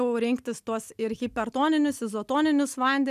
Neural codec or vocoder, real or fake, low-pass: none; real; 14.4 kHz